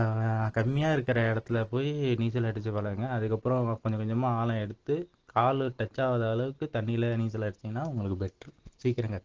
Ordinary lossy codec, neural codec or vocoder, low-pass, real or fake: Opus, 16 kbps; none; 7.2 kHz; real